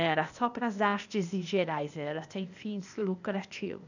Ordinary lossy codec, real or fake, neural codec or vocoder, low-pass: none; fake; codec, 16 kHz, 0.8 kbps, ZipCodec; 7.2 kHz